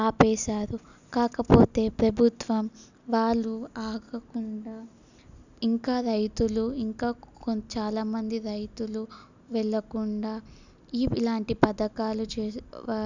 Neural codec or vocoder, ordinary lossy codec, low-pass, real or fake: none; none; 7.2 kHz; real